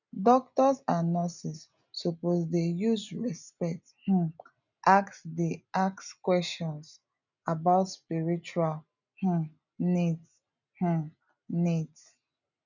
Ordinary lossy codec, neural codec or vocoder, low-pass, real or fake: none; none; 7.2 kHz; real